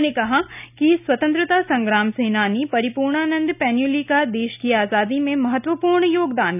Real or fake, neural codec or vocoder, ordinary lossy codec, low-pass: real; none; none; 3.6 kHz